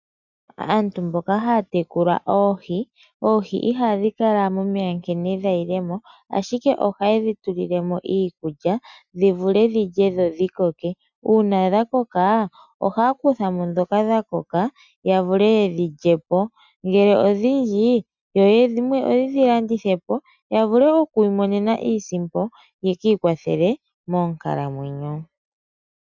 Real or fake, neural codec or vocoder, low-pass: real; none; 7.2 kHz